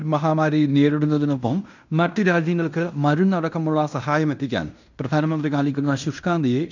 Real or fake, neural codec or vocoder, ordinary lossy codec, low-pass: fake; codec, 16 kHz in and 24 kHz out, 0.9 kbps, LongCat-Audio-Codec, fine tuned four codebook decoder; none; 7.2 kHz